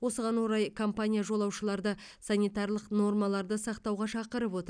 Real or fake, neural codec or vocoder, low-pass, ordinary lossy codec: real; none; 9.9 kHz; none